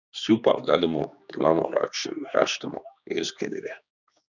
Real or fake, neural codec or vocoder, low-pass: fake; codec, 16 kHz, 2 kbps, X-Codec, HuBERT features, trained on general audio; 7.2 kHz